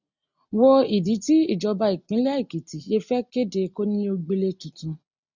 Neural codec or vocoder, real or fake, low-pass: none; real; 7.2 kHz